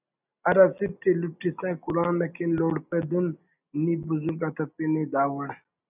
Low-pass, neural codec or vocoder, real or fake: 3.6 kHz; none; real